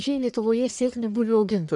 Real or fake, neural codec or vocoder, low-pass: fake; codec, 44.1 kHz, 1.7 kbps, Pupu-Codec; 10.8 kHz